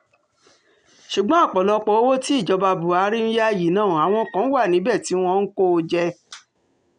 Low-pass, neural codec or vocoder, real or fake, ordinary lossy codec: 9.9 kHz; none; real; none